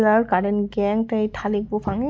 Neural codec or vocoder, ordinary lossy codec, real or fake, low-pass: none; none; real; none